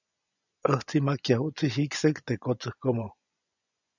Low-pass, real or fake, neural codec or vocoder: 7.2 kHz; fake; vocoder, 24 kHz, 100 mel bands, Vocos